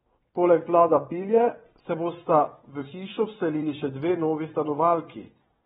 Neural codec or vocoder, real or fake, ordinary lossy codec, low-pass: vocoder, 22.05 kHz, 80 mel bands, WaveNeXt; fake; AAC, 16 kbps; 9.9 kHz